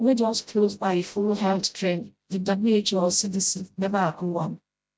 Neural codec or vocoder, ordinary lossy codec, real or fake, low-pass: codec, 16 kHz, 0.5 kbps, FreqCodec, smaller model; none; fake; none